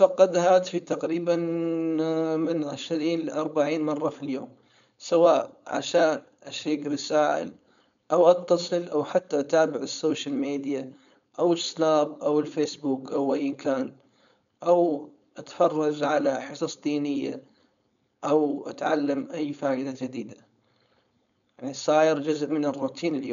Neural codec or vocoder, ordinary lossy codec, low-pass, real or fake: codec, 16 kHz, 4.8 kbps, FACodec; none; 7.2 kHz; fake